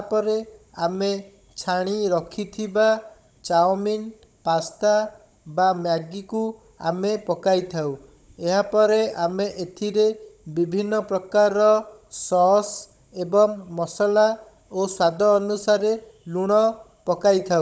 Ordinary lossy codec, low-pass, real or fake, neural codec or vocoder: none; none; fake; codec, 16 kHz, 16 kbps, FunCodec, trained on Chinese and English, 50 frames a second